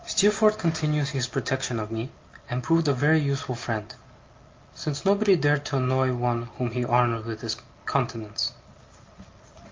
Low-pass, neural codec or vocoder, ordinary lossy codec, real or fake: 7.2 kHz; none; Opus, 24 kbps; real